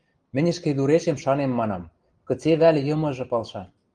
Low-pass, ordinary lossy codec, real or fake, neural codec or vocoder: 9.9 kHz; Opus, 24 kbps; real; none